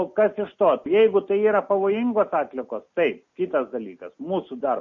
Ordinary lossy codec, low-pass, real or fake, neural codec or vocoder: MP3, 32 kbps; 7.2 kHz; real; none